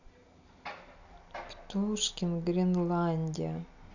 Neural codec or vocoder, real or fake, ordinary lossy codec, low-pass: none; real; none; 7.2 kHz